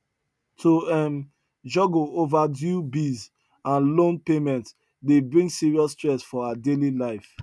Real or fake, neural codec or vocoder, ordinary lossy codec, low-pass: real; none; none; none